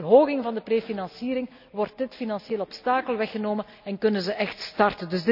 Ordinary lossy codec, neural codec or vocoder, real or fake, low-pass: none; none; real; 5.4 kHz